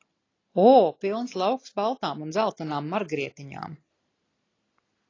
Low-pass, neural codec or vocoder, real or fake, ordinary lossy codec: 7.2 kHz; none; real; AAC, 32 kbps